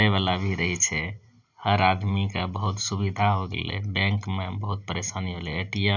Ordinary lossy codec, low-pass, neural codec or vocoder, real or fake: none; 7.2 kHz; none; real